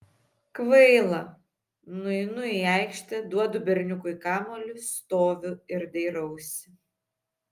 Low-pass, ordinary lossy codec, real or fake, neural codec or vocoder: 14.4 kHz; Opus, 32 kbps; real; none